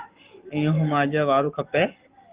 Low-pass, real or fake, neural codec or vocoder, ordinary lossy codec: 3.6 kHz; fake; codec, 44.1 kHz, 7.8 kbps, Pupu-Codec; Opus, 24 kbps